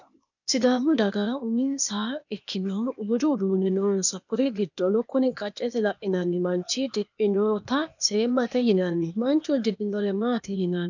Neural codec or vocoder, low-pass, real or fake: codec, 16 kHz, 0.8 kbps, ZipCodec; 7.2 kHz; fake